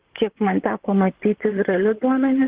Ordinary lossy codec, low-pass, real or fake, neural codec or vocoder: Opus, 16 kbps; 3.6 kHz; fake; vocoder, 44.1 kHz, 128 mel bands, Pupu-Vocoder